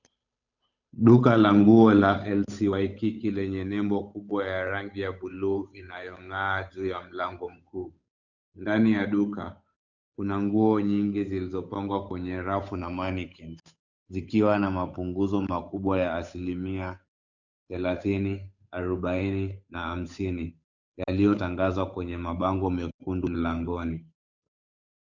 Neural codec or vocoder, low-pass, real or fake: codec, 16 kHz, 8 kbps, FunCodec, trained on Chinese and English, 25 frames a second; 7.2 kHz; fake